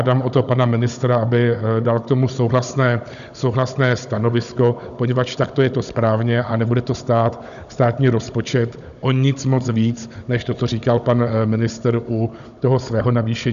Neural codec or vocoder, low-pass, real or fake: codec, 16 kHz, 16 kbps, FunCodec, trained on Chinese and English, 50 frames a second; 7.2 kHz; fake